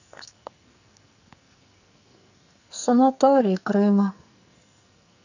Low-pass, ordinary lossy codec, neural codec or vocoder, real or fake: 7.2 kHz; none; codec, 44.1 kHz, 2.6 kbps, SNAC; fake